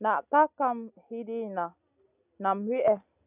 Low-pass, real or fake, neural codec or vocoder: 3.6 kHz; real; none